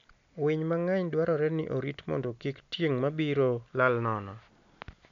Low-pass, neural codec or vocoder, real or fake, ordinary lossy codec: 7.2 kHz; none; real; MP3, 64 kbps